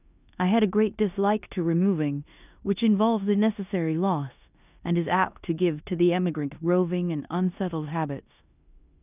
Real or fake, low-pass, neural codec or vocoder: fake; 3.6 kHz; codec, 16 kHz in and 24 kHz out, 0.9 kbps, LongCat-Audio-Codec, four codebook decoder